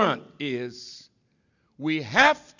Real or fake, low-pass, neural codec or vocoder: real; 7.2 kHz; none